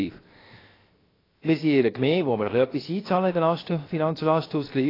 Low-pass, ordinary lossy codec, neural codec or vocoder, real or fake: 5.4 kHz; AAC, 24 kbps; codec, 16 kHz, 0.7 kbps, FocalCodec; fake